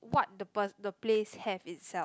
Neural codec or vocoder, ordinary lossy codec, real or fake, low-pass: none; none; real; none